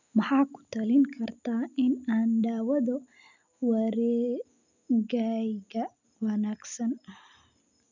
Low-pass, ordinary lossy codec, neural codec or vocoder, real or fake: 7.2 kHz; none; none; real